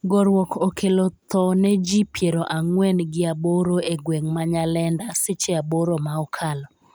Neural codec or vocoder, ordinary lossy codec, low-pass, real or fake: none; none; none; real